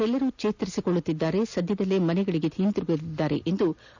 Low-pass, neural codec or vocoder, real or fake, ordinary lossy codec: 7.2 kHz; none; real; none